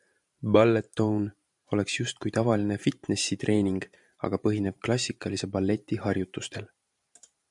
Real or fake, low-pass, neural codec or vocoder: fake; 10.8 kHz; vocoder, 44.1 kHz, 128 mel bands every 512 samples, BigVGAN v2